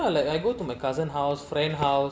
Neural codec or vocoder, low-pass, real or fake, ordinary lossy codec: none; none; real; none